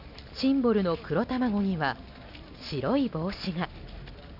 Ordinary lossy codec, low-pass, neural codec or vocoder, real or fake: none; 5.4 kHz; none; real